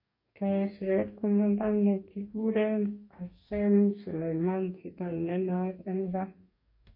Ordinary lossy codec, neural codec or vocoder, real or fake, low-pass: MP3, 32 kbps; codec, 44.1 kHz, 2.6 kbps, DAC; fake; 5.4 kHz